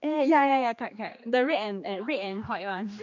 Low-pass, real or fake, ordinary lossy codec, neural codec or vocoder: 7.2 kHz; fake; none; codec, 16 kHz, 2 kbps, X-Codec, HuBERT features, trained on general audio